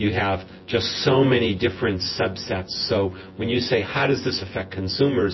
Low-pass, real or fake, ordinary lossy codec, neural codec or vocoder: 7.2 kHz; fake; MP3, 24 kbps; vocoder, 24 kHz, 100 mel bands, Vocos